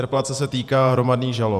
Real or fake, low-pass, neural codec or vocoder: fake; 14.4 kHz; vocoder, 48 kHz, 128 mel bands, Vocos